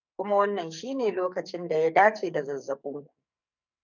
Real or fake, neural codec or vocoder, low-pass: fake; codec, 44.1 kHz, 2.6 kbps, SNAC; 7.2 kHz